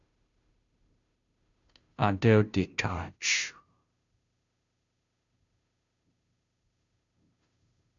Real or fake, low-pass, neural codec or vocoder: fake; 7.2 kHz; codec, 16 kHz, 0.5 kbps, FunCodec, trained on Chinese and English, 25 frames a second